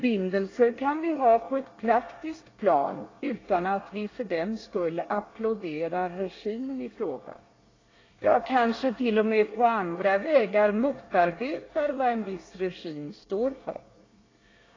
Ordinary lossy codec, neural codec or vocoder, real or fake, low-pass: AAC, 32 kbps; codec, 24 kHz, 1 kbps, SNAC; fake; 7.2 kHz